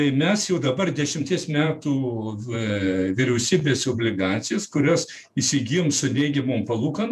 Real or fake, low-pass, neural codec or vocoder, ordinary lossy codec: fake; 14.4 kHz; vocoder, 48 kHz, 128 mel bands, Vocos; MP3, 96 kbps